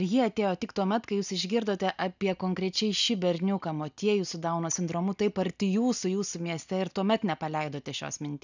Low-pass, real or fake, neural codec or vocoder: 7.2 kHz; real; none